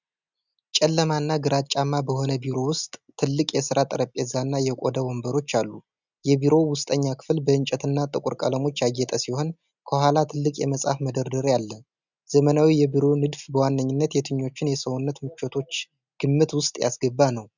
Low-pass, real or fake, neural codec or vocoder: 7.2 kHz; real; none